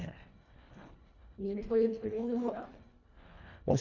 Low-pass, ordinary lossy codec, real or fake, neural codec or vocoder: 7.2 kHz; none; fake; codec, 24 kHz, 1.5 kbps, HILCodec